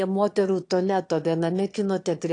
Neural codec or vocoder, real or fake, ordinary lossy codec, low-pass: autoencoder, 22.05 kHz, a latent of 192 numbers a frame, VITS, trained on one speaker; fake; AAC, 48 kbps; 9.9 kHz